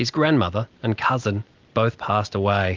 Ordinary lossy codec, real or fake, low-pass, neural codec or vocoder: Opus, 24 kbps; real; 7.2 kHz; none